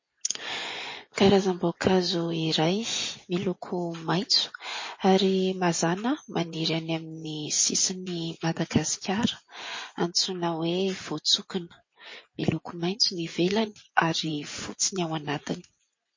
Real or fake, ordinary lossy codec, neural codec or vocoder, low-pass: fake; MP3, 32 kbps; vocoder, 24 kHz, 100 mel bands, Vocos; 7.2 kHz